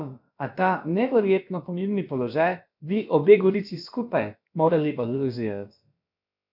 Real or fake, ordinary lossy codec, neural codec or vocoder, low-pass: fake; none; codec, 16 kHz, about 1 kbps, DyCAST, with the encoder's durations; 5.4 kHz